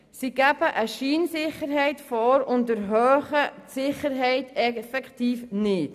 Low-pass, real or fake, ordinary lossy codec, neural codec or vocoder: 14.4 kHz; real; none; none